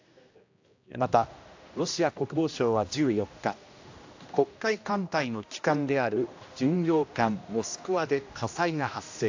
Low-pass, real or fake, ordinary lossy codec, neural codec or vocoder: 7.2 kHz; fake; AAC, 48 kbps; codec, 16 kHz, 1 kbps, X-Codec, HuBERT features, trained on general audio